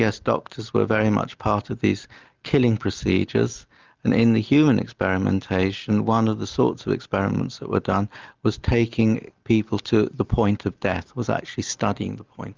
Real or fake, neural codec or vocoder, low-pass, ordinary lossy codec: real; none; 7.2 kHz; Opus, 24 kbps